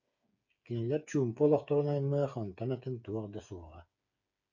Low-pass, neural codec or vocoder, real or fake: 7.2 kHz; codec, 16 kHz, 8 kbps, FreqCodec, smaller model; fake